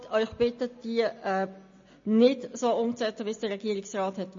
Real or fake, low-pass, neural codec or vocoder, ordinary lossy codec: real; 7.2 kHz; none; MP3, 32 kbps